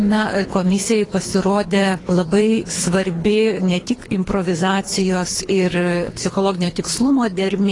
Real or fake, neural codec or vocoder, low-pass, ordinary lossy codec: fake; codec, 24 kHz, 3 kbps, HILCodec; 10.8 kHz; AAC, 32 kbps